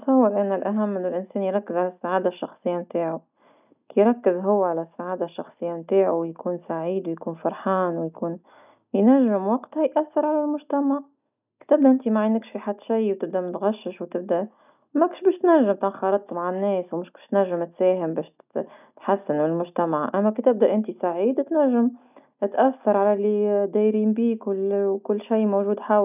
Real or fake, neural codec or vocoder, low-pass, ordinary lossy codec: real; none; 3.6 kHz; none